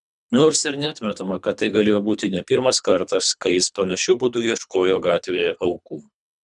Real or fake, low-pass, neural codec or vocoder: fake; 10.8 kHz; codec, 24 kHz, 3 kbps, HILCodec